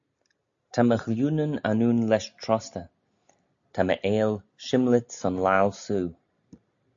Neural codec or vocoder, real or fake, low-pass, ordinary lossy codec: none; real; 7.2 kHz; AAC, 64 kbps